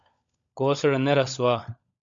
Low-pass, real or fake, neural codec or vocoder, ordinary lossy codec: 7.2 kHz; fake; codec, 16 kHz, 16 kbps, FunCodec, trained on LibriTTS, 50 frames a second; MP3, 64 kbps